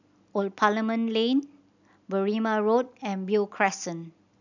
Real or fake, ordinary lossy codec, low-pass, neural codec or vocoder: real; none; 7.2 kHz; none